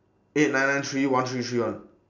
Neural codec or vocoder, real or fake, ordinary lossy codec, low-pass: none; real; none; 7.2 kHz